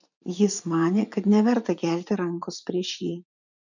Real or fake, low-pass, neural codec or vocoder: fake; 7.2 kHz; vocoder, 44.1 kHz, 80 mel bands, Vocos